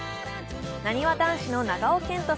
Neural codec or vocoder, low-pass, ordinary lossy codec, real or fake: none; none; none; real